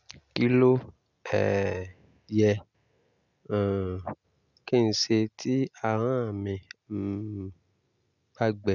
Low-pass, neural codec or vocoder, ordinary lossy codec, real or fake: 7.2 kHz; none; none; real